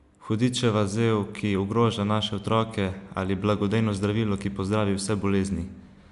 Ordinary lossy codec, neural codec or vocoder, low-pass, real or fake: MP3, 96 kbps; none; 10.8 kHz; real